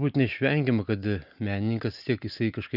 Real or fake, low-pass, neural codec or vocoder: real; 5.4 kHz; none